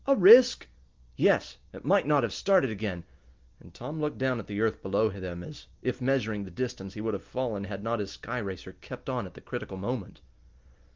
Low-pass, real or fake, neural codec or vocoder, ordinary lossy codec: 7.2 kHz; real; none; Opus, 32 kbps